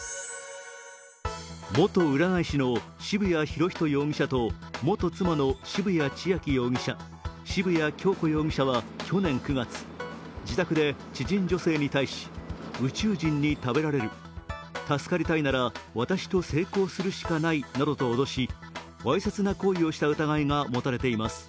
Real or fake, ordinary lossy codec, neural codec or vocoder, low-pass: real; none; none; none